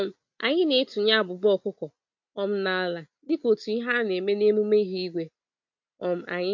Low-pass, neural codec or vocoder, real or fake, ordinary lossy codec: 7.2 kHz; none; real; MP3, 48 kbps